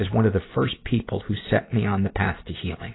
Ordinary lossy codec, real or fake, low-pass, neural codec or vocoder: AAC, 16 kbps; real; 7.2 kHz; none